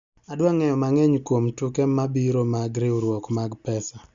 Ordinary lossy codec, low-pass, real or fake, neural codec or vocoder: Opus, 64 kbps; 7.2 kHz; real; none